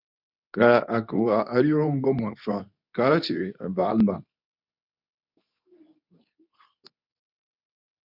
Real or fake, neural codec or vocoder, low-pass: fake; codec, 24 kHz, 0.9 kbps, WavTokenizer, medium speech release version 2; 5.4 kHz